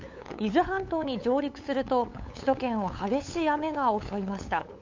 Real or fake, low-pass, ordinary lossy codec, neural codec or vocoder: fake; 7.2 kHz; MP3, 64 kbps; codec, 16 kHz, 8 kbps, FunCodec, trained on LibriTTS, 25 frames a second